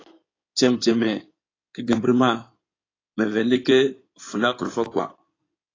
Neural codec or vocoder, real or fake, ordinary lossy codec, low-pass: codec, 16 kHz in and 24 kHz out, 2.2 kbps, FireRedTTS-2 codec; fake; AAC, 32 kbps; 7.2 kHz